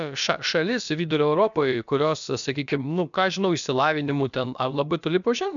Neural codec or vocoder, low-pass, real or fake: codec, 16 kHz, about 1 kbps, DyCAST, with the encoder's durations; 7.2 kHz; fake